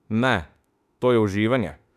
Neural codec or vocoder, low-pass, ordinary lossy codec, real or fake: autoencoder, 48 kHz, 32 numbers a frame, DAC-VAE, trained on Japanese speech; 14.4 kHz; none; fake